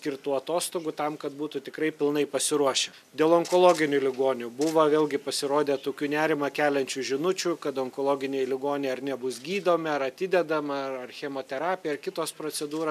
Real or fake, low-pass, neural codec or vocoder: real; 14.4 kHz; none